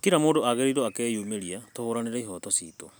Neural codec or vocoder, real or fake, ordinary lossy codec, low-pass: none; real; none; none